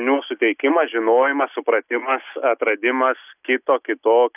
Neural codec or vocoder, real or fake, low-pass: none; real; 3.6 kHz